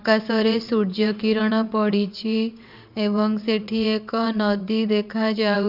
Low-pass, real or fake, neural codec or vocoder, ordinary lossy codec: 5.4 kHz; fake; vocoder, 22.05 kHz, 80 mel bands, WaveNeXt; none